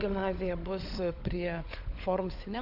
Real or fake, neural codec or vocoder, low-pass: fake; codec, 16 kHz, 16 kbps, FunCodec, trained on LibriTTS, 50 frames a second; 5.4 kHz